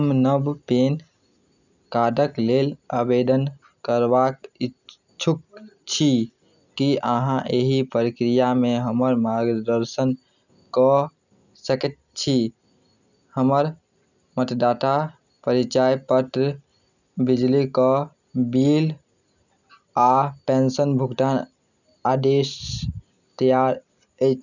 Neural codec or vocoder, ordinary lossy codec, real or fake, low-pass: none; none; real; 7.2 kHz